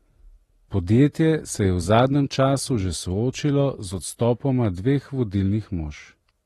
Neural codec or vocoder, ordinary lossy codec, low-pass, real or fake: none; AAC, 32 kbps; 19.8 kHz; real